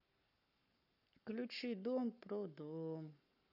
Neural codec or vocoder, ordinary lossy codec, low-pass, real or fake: codec, 44.1 kHz, 7.8 kbps, Pupu-Codec; none; 5.4 kHz; fake